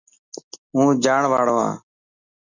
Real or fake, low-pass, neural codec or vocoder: real; 7.2 kHz; none